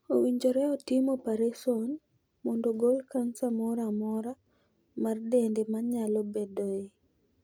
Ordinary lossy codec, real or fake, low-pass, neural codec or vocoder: none; real; none; none